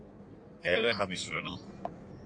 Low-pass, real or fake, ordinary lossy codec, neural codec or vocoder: 9.9 kHz; fake; AAC, 64 kbps; codec, 16 kHz in and 24 kHz out, 1.1 kbps, FireRedTTS-2 codec